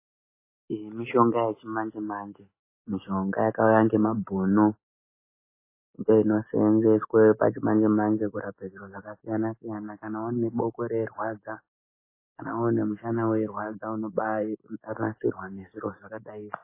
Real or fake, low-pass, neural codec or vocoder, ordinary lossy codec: real; 3.6 kHz; none; MP3, 16 kbps